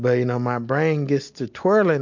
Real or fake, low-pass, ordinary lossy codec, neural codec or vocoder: real; 7.2 kHz; MP3, 48 kbps; none